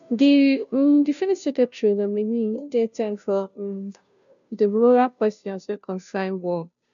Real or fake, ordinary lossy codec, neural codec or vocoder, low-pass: fake; none; codec, 16 kHz, 0.5 kbps, FunCodec, trained on Chinese and English, 25 frames a second; 7.2 kHz